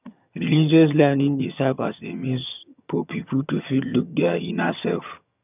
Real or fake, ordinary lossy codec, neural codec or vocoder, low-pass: fake; none; vocoder, 22.05 kHz, 80 mel bands, HiFi-GAN; 3.6 kHz